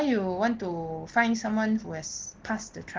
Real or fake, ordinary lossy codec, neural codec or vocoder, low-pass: real; Opus, 16 kbps; none; 7.2 kHz